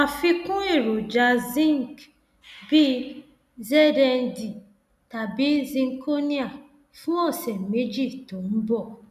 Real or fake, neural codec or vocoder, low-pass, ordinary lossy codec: real; none; 14.4 kHz; none